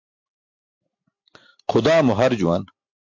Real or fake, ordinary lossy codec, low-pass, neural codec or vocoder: real; MP3, 48 kbps; 7.2 kHz; none